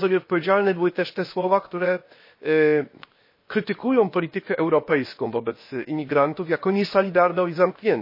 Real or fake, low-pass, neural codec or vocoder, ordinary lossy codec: fake; 5.4 kHz; codec, 16 kHz, 0.7 kbps, FocalCodec; MP3, 24 kbps